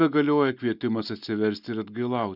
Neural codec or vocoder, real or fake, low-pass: none; real; 5.4 kHz